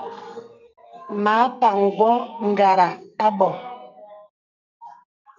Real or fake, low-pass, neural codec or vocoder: fake; 7.2 kHz; codec, 44.1 kHz, 2.6 kbps, SNAC